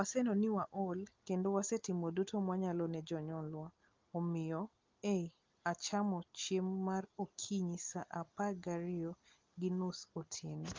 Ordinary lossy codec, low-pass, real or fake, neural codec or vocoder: Opus, 32 kbps; 7.2 kHz; real; none